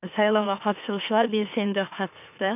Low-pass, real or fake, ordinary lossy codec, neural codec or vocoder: 3.6 kHz; fake; none; autoencoder, 44.1 kHz, a latent of 192 numbers a frame, MeloTTS